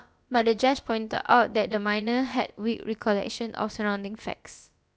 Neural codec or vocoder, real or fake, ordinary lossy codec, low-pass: codec, 16 kHz, about 1 kbps, DyCAST, with the encoder's durations; fake; none; none